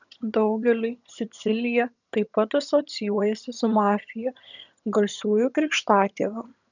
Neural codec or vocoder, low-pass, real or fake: vocoder, 22.05 kHz, 80 mel bands, HiFi-GAN; 7.2 kHz; fake